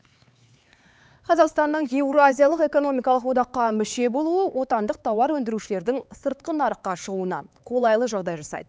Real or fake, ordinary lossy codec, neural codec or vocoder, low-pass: fake; none; codec, 16 kHz, 4 kbps, X-Codec, HuBERT features, trained on LibriSpeech; none